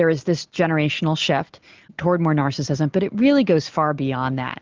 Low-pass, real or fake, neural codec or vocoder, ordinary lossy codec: 7.2 kHz; real; none; Opus, 24 kbps